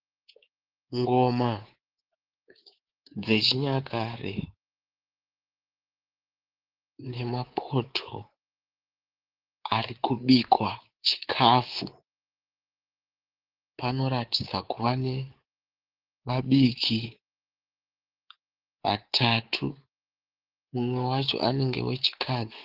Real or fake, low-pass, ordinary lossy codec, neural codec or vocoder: fake; 5.4 kHz; Opus, 16 kbps; codec, 24 kHz, 3.1 kbps, DualCodec